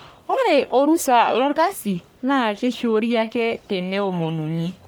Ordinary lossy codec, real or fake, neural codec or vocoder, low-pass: none; fake; codec, 44.1 kHz, 1.7 kbps, Pupu-Codec; none